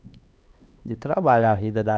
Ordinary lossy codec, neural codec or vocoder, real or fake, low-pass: none; codec, 16 kHz, 2 kbps, X-Codec, HuBERT features, trained on LibriSpeech; fake; none